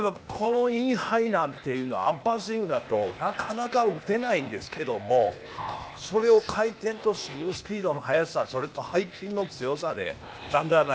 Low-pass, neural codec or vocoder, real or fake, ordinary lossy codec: none; codec, 16 kHz, 0.8 kbps, ZipCodec; fake; none